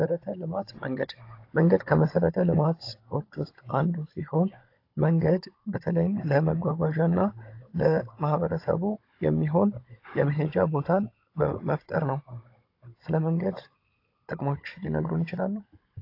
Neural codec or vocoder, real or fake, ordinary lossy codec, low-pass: codec, 16 kHz, 16 kbps, FunCodec, trained on Chinese and English, 50 frames a second; fake; AAC, 32 kbps; 5.4 kHz